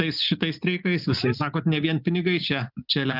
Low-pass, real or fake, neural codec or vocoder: 5.4 kHz; real; none